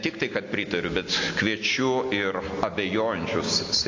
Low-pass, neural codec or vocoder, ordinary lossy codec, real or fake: 7.2 kHz; none; AAC, 48 kbps; real